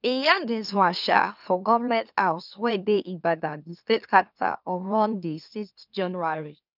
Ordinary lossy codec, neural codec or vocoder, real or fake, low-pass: none; autoencoder, 44.1 kHz, a latent of 192 numbers a frame, MeloTTS; fake; 5.4 kHz